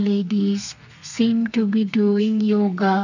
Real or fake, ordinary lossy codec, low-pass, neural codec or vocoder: fake; none; 7.2 kHz; codec, 32 kHz, 1.9 kbps, SNAC